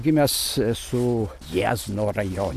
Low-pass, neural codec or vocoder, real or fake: 14.4 kHz; none; real